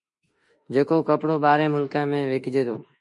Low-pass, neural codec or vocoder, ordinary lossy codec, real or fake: 10.8 kHz; autoencoder, 48 kHz, 32 numbers a frame, DAC-VAE, trained on Japanese speech; MP3, 48 kbps; fake